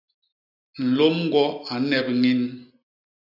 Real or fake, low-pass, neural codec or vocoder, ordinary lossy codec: real; 5.4 kHz; none; AAC, 48 kbps